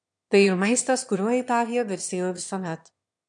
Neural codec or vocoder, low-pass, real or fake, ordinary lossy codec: autoencoder, 22.05 kHz, a latent of 192 numbers a frame, VITS, trained on one speaker; 9.9 kHz; fake; MP3, 96 kbps